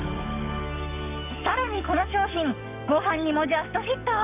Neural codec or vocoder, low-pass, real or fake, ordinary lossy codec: codec, 44.1 kHz, 7.8 kbps, DAC; 3.6 kHz; fake; none